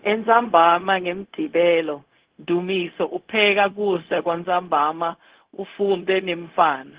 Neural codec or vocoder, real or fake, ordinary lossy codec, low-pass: codec, 16 kHz, 0.4 kbps, LongCat-Audio-Codec; fake; Opus, 16 kbps; 3.6 kHz